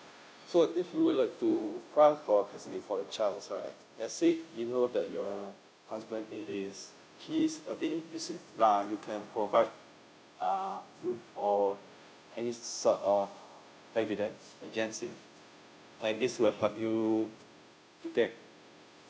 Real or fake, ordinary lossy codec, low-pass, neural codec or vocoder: fake; none; none; codec, 16 kHz, 0.5 kbps, FunCodec, trained on Chinese and English, 25 frames a second